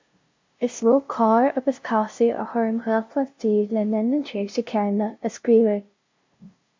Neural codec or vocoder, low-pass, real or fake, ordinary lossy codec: codec, 16 kHz, 0.5 kbps, FunCodec, trained on LibriTTS, 25 frames a second; 7.2 kHz; fake; MP3, 64 kbps